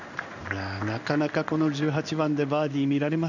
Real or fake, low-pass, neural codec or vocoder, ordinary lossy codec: fake; 7.2 kHz; codec, 16 kHz in and 24 kHz out, 1 kbps, XY-Tokenizer; none